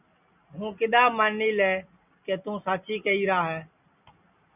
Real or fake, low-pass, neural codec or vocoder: real; 3.6 kHz; none